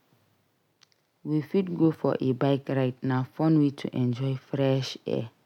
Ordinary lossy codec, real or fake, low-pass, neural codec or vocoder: none; real; 19.8 kHz; none